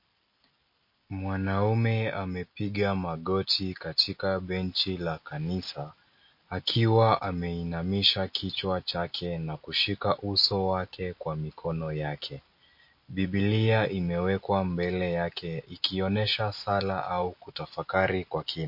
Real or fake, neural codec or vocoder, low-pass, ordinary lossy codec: real; none; 5.4 kHz; MP3, 32 kbps